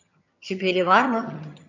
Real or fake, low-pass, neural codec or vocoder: fake; 7.2 kHz; vocoder, 22.05 kHz, 80 mel bands, HiFi-GAN